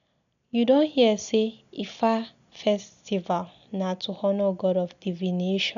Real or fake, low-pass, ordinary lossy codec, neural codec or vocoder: real; 7.2 kHz; none; none